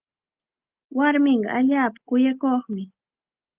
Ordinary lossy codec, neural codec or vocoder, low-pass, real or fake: Opus, 32 kbps; none; 3.6 kHz; real